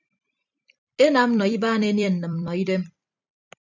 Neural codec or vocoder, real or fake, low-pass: vocoder, 44.1 kHz, 128 mel bands every 256 samples, BigVGAN v2; fake; 7.2 kHz